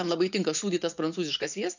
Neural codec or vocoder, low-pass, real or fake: vocoder, 44.1 kHz, 80 mel bands, Vocos; 7.2 kHz; fake